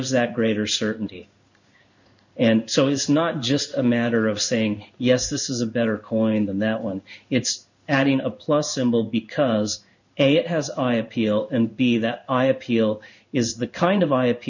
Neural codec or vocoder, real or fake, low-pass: codec, 16 kHz in and 24 kHz out, 1 kbps, XY-Tokenizer; fake; 7.2 kHz